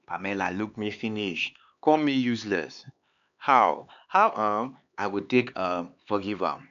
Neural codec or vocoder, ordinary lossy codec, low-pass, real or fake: codec, 16 kHz, 4 kbps, X-Codec, HuBERT features, trained on LibriSpeech; none; 7.2 kHz; fake